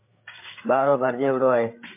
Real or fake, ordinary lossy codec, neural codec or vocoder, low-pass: fake; MP3, 24 kbps; codec, 16 kHz, 8 kbps, FreqCodec, larger model; 3.6 kHz